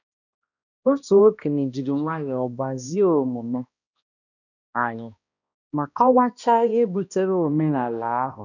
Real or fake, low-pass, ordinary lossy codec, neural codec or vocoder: fake; 7.2 kHz; none; codec, 16 kHz, 1 kbps, X-Codec, HuBERT features, trained on balanced general audio